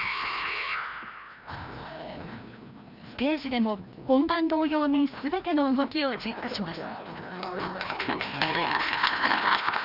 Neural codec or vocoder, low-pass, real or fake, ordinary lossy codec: codec, 16 kHz, 1 kbps, FreqCodec, larger model; 5.4 kHz; fake; none